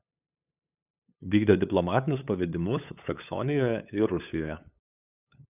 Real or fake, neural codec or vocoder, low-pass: fake; codec, 16 kHz, 8 kbps, FunCodec, trained on LibriTTS, 25 frames a second; 3.6 kHz